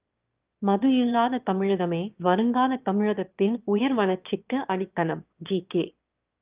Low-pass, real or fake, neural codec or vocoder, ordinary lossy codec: 3.6 kHz; fake; autoencoder, 22.05 kHz, a latent of 192 numbers a frame, VITS, trained on one speaker; Opus, 24 kbps